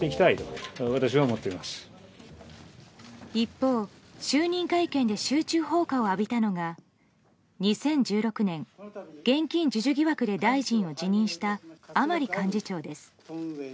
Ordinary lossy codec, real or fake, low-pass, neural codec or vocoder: none; real; none; none